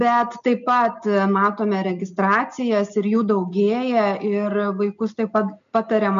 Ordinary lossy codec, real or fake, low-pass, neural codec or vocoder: MP3, 64 kbps; real; 7.2 kHz; none